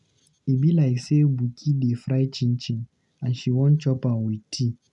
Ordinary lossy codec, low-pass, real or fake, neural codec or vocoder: none; 10.8 kHz; real; none